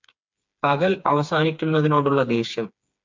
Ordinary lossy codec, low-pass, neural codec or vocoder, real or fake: MP3, 64 kbps; 7.2 kHz; codec, 16 kHz, 4 kbps, FreqCodec, smaller model; fake